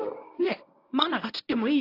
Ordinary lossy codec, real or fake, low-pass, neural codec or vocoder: AAC, 32 kbps; fake; 5.4 kHz; codec, 24 kHz, 0.9 kbps, WavTokenizer, medium speech release version 1